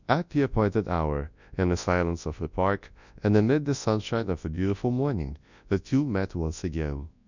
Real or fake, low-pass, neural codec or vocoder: fake; 7.2 kHz; codec, 24 kHz, 0.9 kbps, WavTokenizer, large speech release